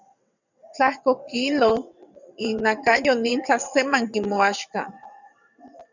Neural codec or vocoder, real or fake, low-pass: vocoder, 22.05 kHz, 80 mel bands, WaveNeXt; fake; 7.2 kHz